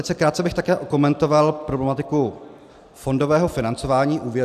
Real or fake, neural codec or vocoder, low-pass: real; none; 14.4 kHz